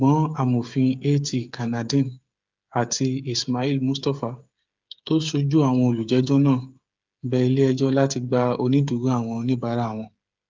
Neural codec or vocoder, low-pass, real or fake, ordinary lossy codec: codec, 16 kHz, 8 kbps, FreqCodec, smaller model; 7.2 kHz; fake; Opus, 24 kbps